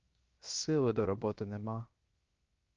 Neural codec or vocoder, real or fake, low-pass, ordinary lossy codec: codec, 16 kHz, 0.7 kbps, FocalCodec; fake; 7.2 kHz; Opus, 24 kbps